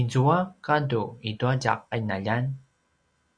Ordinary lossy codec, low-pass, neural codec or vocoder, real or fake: Opus, 64 kbps; 9.9 kHz; none; real